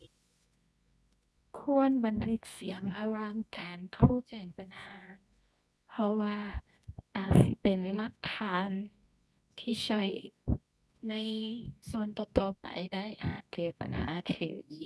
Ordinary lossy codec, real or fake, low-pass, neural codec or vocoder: none; fake; none; codec, 24 kHz, 0.9 kbps, WavTokenizer, medium music audio release